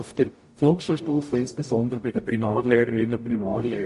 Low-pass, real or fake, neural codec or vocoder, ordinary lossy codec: 14.4 kHz; fake; codec, 44.1 kHz, 0.9 kbps, DAC; MP3, 48 kbps